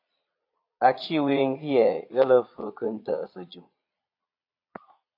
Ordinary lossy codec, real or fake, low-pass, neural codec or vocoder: AAC, 32 kbps; fake; 5.4 kHz; vocoder, 22.05 kHz, 80 mel bands, Vocos